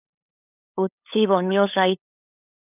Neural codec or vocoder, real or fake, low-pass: codec, 16 kHz, 8 kbps, FunCodec, trained on LibriTTS, 25 frames a second; fake; 3.6 kHz